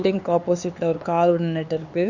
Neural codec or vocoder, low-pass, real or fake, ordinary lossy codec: codec, 16 kHz, 4 kbps, X-Codec, HuBERT features, trained on LibriSpeech; 7.2 kHz; fake; none